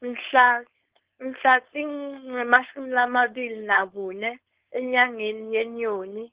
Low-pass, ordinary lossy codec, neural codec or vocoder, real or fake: 3.6 kHz; Opus, 16 kbps; codec, 16 kHz, 4.8 kbps, FACodec; fake